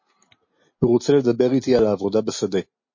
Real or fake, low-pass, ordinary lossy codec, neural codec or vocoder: real; 7.2 kHz; MP3, 32 kbps; none